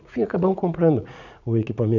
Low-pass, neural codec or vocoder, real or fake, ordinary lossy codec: 7.2 kHz; vocoder, 44.1 kHz, 80 mel bands, Vocos; fake; none